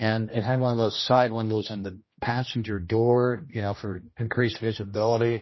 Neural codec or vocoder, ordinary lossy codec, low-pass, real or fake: codec, 16 kHz, 1 kbps, X-Codec, HuBERT features, trained on general audio; MP3, 24 kbps; 7.2 kHz; fake